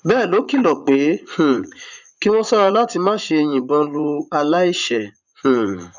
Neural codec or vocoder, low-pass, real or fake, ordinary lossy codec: vocoder, 44.1 kHz, 80 mel bands, Vocos; 7.2 kHz; fake; none